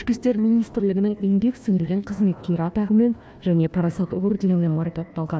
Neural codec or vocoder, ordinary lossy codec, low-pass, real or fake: codec, 16 kHz, 1 kbps, FunCodec, trained on Chinese and English, 50 frames a second; none; none; fake